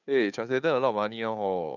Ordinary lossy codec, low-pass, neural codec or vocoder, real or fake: none; 7.2 kHz; codec, 16 kHz, 2 kbps, FunCodec, trained on Chinese and English, 25 frames a second; fake